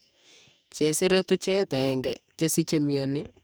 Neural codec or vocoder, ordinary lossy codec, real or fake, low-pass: codec, 44.1 kHz, 2.6 kbps, DAC; none; fake; none